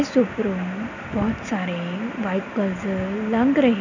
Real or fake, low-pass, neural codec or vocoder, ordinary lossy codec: real; 7.2 kHz; none; none